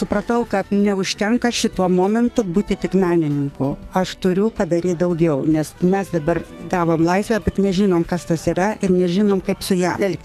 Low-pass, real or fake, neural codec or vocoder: 14.4 kHz; fake; codec, 44.1 kHz, 2.6 kbps, SNAC